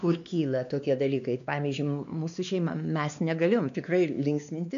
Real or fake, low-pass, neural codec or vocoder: fake; 7.2 kHz; codec, 16 kHz, 2 kbps, X-Codec, WavLM features, trained on Multilingual LibriSpeech